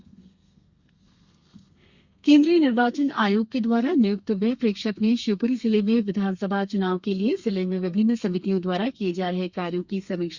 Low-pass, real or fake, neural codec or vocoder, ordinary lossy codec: 7.2 kHz; fake; codec, 32 kHz, 1.9 kbps, SNAC; Opus, 64 kbps